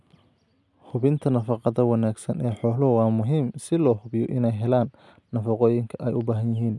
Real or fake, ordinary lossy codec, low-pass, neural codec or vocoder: real; none; none; none